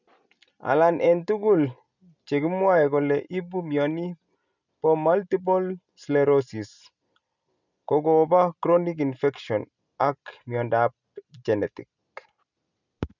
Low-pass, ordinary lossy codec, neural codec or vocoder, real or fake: 7.2 kHz; none; none; real